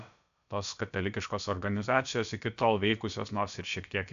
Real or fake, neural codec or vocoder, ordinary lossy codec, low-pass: fake; codec, 16 kHz, about 1 kbps, DyCAST, with the encoder's durations; AAC, 96 kbps; 7.2 kHz